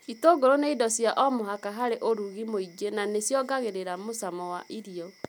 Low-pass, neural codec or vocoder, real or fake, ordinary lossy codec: none; none; real; none